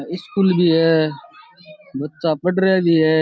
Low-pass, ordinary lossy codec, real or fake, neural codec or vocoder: none; none; real; none